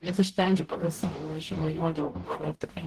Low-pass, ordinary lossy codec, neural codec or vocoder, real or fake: 14.4 kHz; Opus, 16 kbps; codec, 44.1 kHz, 0.9 kbps, DAC; fake